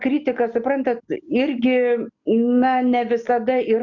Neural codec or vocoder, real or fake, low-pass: none; real; 7.2 kHz